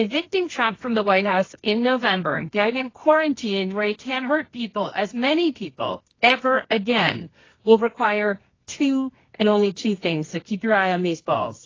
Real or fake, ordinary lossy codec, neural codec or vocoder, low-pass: fake; AAC, 32 kbps; codec, 24 kHz, 0.9 kbps, WavTokenizer, medium music audio release; 7.2 kHz